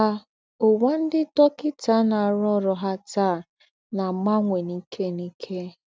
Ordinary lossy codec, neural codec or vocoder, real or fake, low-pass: none; none; real; none